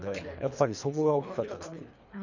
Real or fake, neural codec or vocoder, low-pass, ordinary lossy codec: fake; codec, 24 kHz, 3 kbps, HILCodec; 7.2 kHz; none